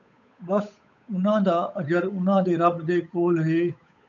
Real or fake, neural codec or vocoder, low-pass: fake; codec, 16 kHz, 8 kbps, FunCodec, trained on Chinese and English, 25 frames a second; 7.2 kHz